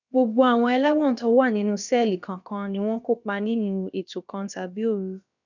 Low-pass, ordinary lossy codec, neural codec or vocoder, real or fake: 7.2 kHz; none; codec, 16 kHz, about 1 kbps, DyCAST, with the encoder's durations; fake